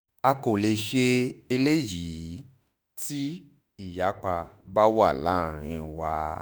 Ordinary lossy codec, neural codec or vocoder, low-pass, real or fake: none; autoencoder, 48 kHz, 32 numbers a frame, DAC-VAE, trained on Japanese speech; none; fake